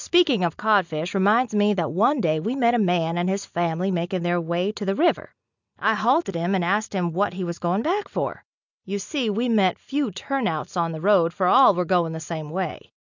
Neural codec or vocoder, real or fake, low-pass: none; real; 7.2 kHz